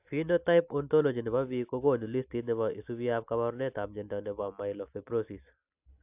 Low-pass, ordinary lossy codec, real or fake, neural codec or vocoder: 3.6 kHz; AAC, 32 kbps; real; none